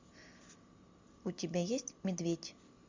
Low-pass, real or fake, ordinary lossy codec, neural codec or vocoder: 7.2 kHz; real; MP3, 64 kbps; none